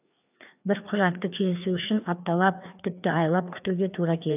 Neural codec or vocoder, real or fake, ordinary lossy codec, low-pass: codec, 16 kHz, 2 kbps, FreqCodec, larger model; fake; none; 3.6 kHz